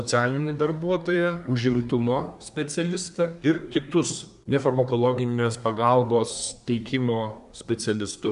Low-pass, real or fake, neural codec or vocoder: 10.8 kHz; fake; codec, 24 kHz, 1 kbps, SNAC